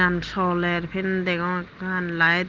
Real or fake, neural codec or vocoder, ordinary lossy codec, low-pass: real; none; Opus, 16 kbps; 7.2 kHz